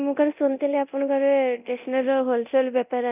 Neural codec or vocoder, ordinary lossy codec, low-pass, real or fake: codec, 24 kHz, 0.9 kbps, DualCodec; none; 3.6 kHz; fake